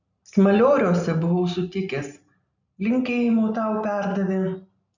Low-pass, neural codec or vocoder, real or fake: 7.2 kHz; none; real